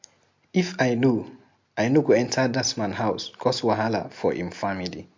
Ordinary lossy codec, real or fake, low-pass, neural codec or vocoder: MP3, 48 kbps; real; 7.2 kHz; none